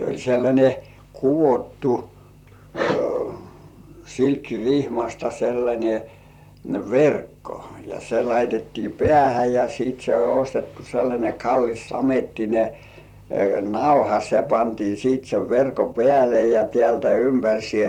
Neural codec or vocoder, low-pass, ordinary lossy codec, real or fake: vocoder, 44.1 kHz, 128 mel bands, Pupu-Vocoder; 19.8 kHz; none; fake